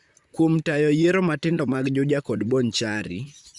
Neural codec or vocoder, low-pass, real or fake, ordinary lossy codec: vocoder, 44.1 kHz, 128 mel bands, Pupu-Vocoder; 10.8 kHz; fake; Opus, 64 kbps